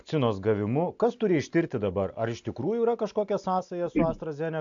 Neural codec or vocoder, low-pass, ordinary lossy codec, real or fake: none; 7.2 kHz; AAC, 64 kbps; real